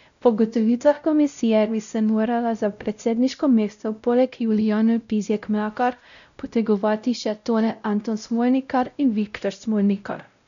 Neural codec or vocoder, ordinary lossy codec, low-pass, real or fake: codec, 16 kHz, 0.5 kbps, X-Codec, WavLM features, trained on Multilingual LibriSpeech; none; 7.2 kHz; fake